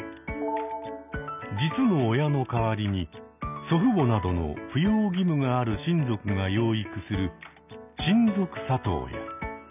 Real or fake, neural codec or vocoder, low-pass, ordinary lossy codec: real; none; 3.6 kHz; none